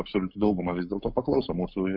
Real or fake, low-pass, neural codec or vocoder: fake; 5.4 kHz; codec, 44.1 kHz, 7.8 kbps, Pupu-Codec